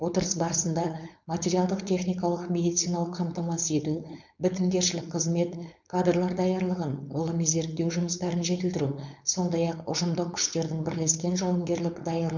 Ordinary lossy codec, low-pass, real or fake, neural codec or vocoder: none; 7.2 kHz; fake; codec, 16 kHz, 4.8 kbps, FACodec